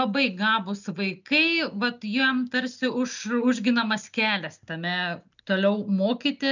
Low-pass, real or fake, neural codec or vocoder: 7.2 kHz; real; none